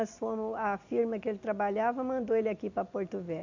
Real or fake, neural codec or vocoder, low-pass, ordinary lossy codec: real; none; 7.2 kHz; AAC, 48 kbps